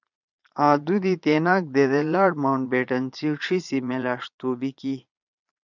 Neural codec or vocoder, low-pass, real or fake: vocoder, 22.05 kHz, 80 mel bands, Vocos; 7.2 kHz; fake